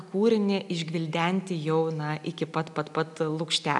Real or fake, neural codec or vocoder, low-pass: real; none; 10.8 kHz